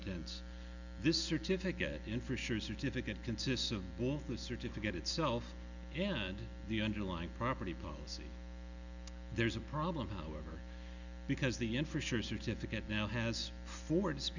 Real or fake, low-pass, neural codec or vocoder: real; 7.2 kHz; none